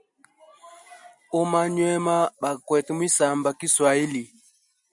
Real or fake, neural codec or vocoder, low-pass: real; none; 10.8 kHz